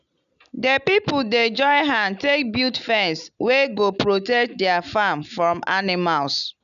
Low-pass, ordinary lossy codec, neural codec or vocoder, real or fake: 7.2 kHz; none; none; real